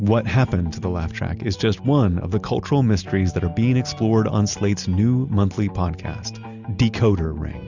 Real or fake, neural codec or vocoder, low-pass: real; none; 7.2 kHz